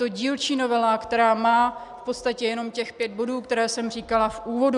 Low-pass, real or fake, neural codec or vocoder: 10.8 kHz; real; none